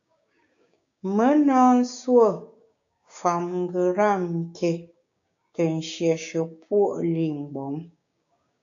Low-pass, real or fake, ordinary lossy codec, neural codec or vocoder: 7.2 kHz; fake; AAC, 64 kbps; codec, 16 kHz, 6 kbps, DAC